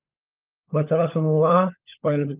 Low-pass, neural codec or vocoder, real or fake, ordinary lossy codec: 3.6 kHz; codec, 16 kHz, 16 kbps, FunCodec, trained on LibriTTS, 50 frames a second; fake; Opus, 24 kbps